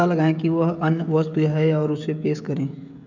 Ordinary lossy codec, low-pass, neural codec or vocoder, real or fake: none; 7.2 kHz; codec, 16 kHz, 16 kbps, FreqCodec, smaller model; fake